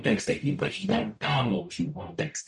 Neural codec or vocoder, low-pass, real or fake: codec, 44.1 kHz, 0.9 kbps, DAC; 10.8 kHz; fake